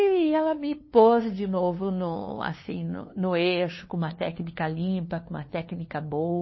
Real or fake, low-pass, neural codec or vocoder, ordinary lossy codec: fake; 7.2 kHz; codec, 16 kHz, 2 kbps, FunCodec, trained on LibriTTS, 25 frames a second; MP3, 24 kbps